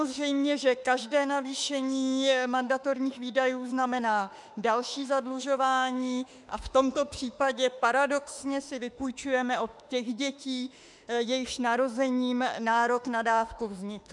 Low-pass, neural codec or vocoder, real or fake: 10.8 kHz; autoencoder, 48 kHz, 32 numbers a frame, DAC-VAE, trained on Japanese speech; fake